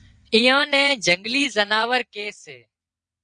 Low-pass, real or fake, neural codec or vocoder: 9.9 kHz; fake; vocoder, 22.05 kHz, 80 mel bands, WaveNeXt